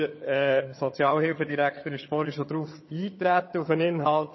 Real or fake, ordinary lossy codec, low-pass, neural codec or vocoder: fake; MP3, 24 kbps; 7.2 kHz; vocoder, 22.05 kHz, 80 mel bands, HiFi-GAN